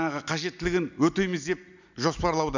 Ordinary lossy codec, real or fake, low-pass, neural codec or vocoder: none; real; 7.2 kHz; none